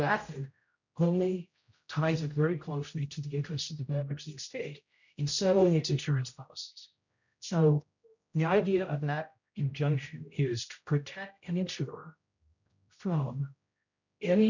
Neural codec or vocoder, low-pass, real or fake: codec, 16 kHz, 0.5 kbps, X-Codec, HuBERT features, trained on general audio; 7.2 kHz; fake